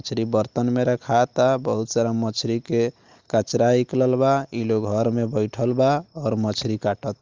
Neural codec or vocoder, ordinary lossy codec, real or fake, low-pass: none; Opus, 24 kbps; real; 7.2 kHz